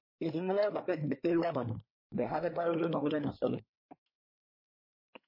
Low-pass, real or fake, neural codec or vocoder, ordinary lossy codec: 5.4 kHz; fake; codec, 24 kHz, 1 kbps, SNAC; MP3, 24 kbps